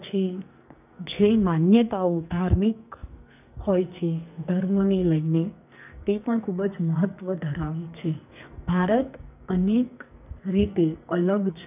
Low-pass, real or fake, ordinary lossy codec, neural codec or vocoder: 3.6 kHz; fake; none; codec, 44.1 kHz, 2.6 kbps, SNAC